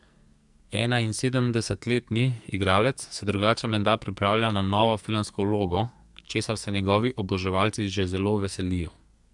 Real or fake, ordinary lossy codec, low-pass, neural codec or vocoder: fake; none; 10.8 kHz; codec, 44.1 kHz, 2.6 kbps, SNAC